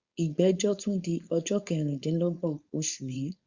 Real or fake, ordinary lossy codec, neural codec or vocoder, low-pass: fake; Opus, 64 kbps; codec, 16 kHz, 4.8 kbps, FACodec; 7.2 kHz